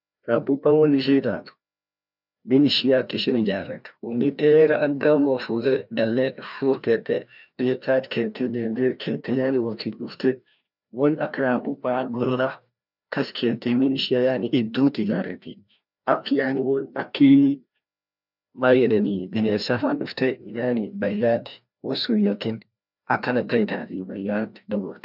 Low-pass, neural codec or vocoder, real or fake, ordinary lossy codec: 5.4 kHz; codec, 16 kHz, 1 kbps, FreqCodec, larger model; fake; none